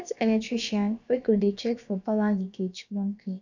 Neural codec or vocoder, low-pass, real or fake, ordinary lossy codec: codec, 16 kHz, about 1 kbps, DyCAST, with the encoder's durations; 7.2 kHz; fake; none